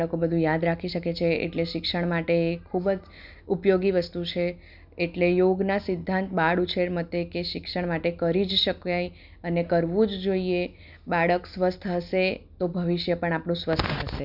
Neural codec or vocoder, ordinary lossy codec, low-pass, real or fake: none; none; 5.4 kHz; real